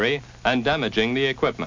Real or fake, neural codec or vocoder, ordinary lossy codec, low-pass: real; none; MP3, 48 kbps; 7.2 kHz